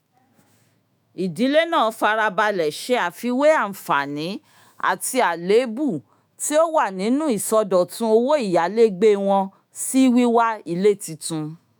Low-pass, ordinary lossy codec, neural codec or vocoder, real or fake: none; none; autoencoder, 48 kHz, 128 numbers a frame, DAC-VAE, trained on Japanese speech; fake